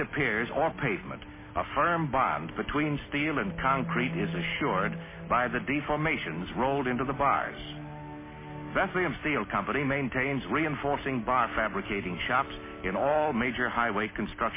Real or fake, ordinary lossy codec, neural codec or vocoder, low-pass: real; MP3, 16 kbps; none; 3.6 kHz